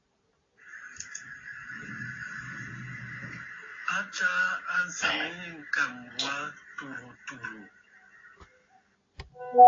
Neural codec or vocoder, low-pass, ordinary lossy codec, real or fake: none; 7.2 kHz; AAC, 32 kbps; real